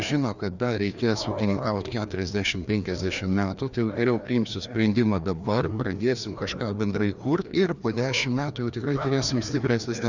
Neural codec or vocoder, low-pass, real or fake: codec, 16 kHz, 2 kbps, FreqCodec, larger model; 7.2 kHz; fake